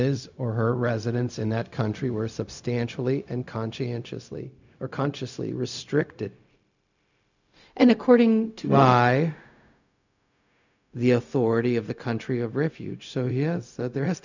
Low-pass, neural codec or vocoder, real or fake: 7.2 kHz; codec, 16 kHz, 0.4 kbps, LongCat-Audio-Codec; fake